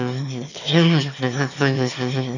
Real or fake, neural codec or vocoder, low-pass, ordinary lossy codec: fake; autoencoder, 22.05 kHz, a latent of 192 numbers a frame, VITS, trained on one speaker; 7.2 kHz; none